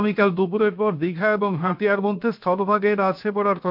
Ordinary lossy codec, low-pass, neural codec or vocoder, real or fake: MP3, 48 kbps; 5.4 kHz; codec, 16 kHz, 0.7 kbps, FocalCodec; fake